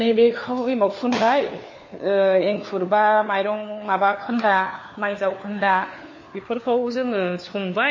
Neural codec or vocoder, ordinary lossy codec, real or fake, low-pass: codec, 16 kHz, 4 kbps, FunCodec, trained on LibriTTS, 50 frames a second; MP3, 32 kbps; fake; 7.2 kHz